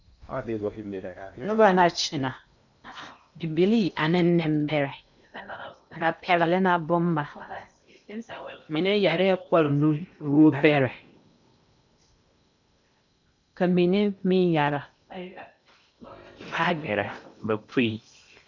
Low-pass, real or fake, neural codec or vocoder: 7.2 kHz; fake; codec, 16 kHz in and 24 kHz out, 0.8 kbps, FocalCodec, streaming, 65536 codes